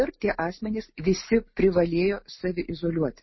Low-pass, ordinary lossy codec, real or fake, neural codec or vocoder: 7.2 kHz; MP3, 24 kbps; real; none